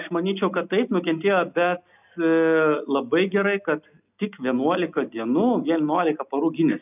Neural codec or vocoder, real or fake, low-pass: none; real; 3.6 kHz